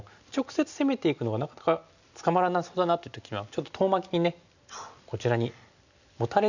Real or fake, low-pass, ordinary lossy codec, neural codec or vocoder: real; 7.2 kHz; none; none